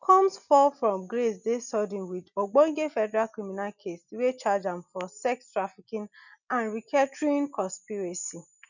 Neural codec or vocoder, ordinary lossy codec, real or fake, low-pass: none; none; real; 7.2 kHz